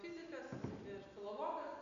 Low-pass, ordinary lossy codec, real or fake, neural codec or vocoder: 7.2 kHz; AAC, 48 kbps; real; none